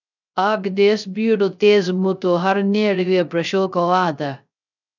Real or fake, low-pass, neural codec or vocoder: fake; 7.2 kHz; codec, 16 kHz, 0.3 kbps, FocalCodec